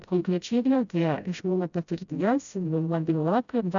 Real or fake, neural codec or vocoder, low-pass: fake; codec, 16 kHz, 0.5 kbps, FreqCodec, smaller model; 7.2 kHz